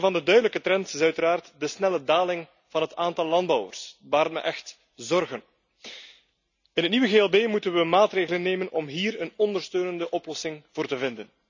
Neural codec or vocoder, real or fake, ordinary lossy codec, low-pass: none; real; none; 7.2 kHz